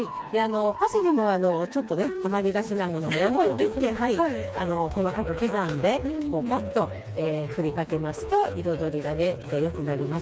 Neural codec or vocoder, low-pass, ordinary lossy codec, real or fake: codec, 16 kHz, 2 kbps, FreqCodec, smaller model; none; none; fake